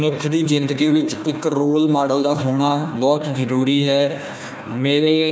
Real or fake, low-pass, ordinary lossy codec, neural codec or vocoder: fake; none; none; codec, 16 kHz, 1 kbps, FunCodec, trained on Chinese and English, 50 frames a second